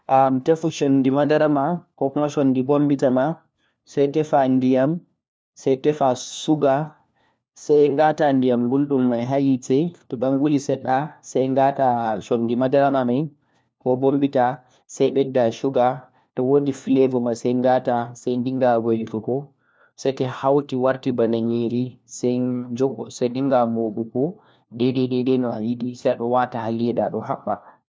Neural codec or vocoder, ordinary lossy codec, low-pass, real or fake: codec, 16 kHz, 1 kbps, FunCodec, trained on LibriTTS, 50 frames a second; none; none; fake